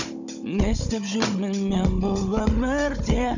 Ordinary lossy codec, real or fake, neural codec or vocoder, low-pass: none; fake; codec, 16 kHz, 16 kbps, FunCodec, trained on Chinese and English, 50 frames a second; 7.2 kHz